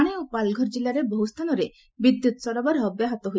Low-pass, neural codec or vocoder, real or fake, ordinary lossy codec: none; none; real; none